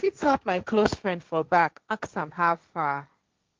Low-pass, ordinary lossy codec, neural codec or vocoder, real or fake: 7.2 kHz; Opus, 32 kbps; codec, 16 kHz, 1.1 kbps, Voila-Tokenizer; fake